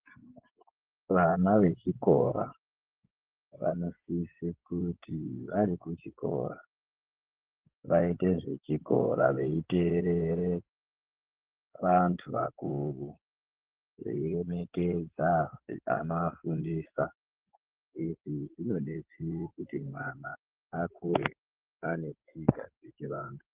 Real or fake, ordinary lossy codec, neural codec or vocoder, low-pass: fake; Opus, 16 kbps; vocoder, 24 kHz, 100 mel bands, Vocos; 3.6 kHz